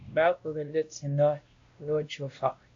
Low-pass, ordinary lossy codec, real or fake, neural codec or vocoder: 7.2 kHz; AAC, 48 kbps; fake; codec, 16 kHz, 1 kbps, X-Codec, WavLM features, trained on Multilingual LibriSpeech